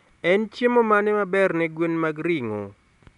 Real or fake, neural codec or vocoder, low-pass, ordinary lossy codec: real; none; 10.8 kHz; none